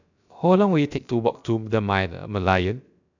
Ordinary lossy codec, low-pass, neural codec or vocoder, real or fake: none; 7.2 kHz; codec, 16 kHz, about 1 kbps, DyCAST, with the encoder's durations; fake